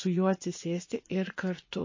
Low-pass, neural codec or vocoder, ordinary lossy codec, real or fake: 7.2 kHz; vocoder, 24 kHz, 100 mel bands, Vocos; MP3, 32 kbps; fake